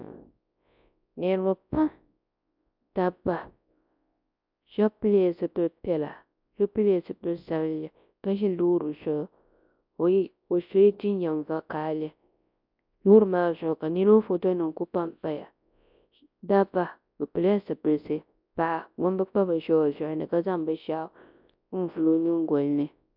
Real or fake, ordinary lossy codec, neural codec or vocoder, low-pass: fake; MP3, 48 kbps; codec, 24 kHz, 0.9 kbps, WavTokenizer, large speech release; 5.4 kHz